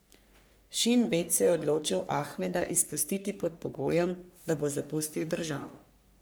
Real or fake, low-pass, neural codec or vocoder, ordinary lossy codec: fake; none; codec, 44.1 kHz, 3.4 kbps, Pupu-Codec; none